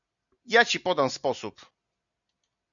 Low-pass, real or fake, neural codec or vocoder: 7.2 kHz; real; none